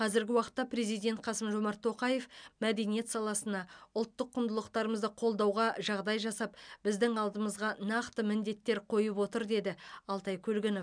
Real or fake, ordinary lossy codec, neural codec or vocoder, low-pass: real; MP3, 96 kbps; none; 9.9 kHz